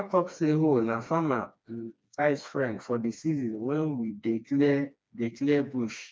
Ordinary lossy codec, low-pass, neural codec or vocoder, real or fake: none; none; codec, 16 kHz, 2 kbps, FreqCodec, smaller model; fake